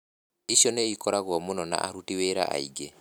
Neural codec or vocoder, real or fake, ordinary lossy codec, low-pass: none; real; none; none